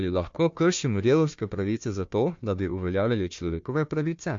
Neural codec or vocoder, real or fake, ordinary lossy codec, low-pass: codec, 16 kHz, 1 kbps, FunCodec, trained on Chinese and English, 50 frames a second; fake; MP3, 48 kbps; 7.2 kHz